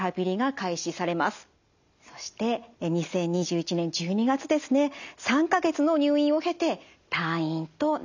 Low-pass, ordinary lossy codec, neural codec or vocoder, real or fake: 7.2 kHz; none; none; real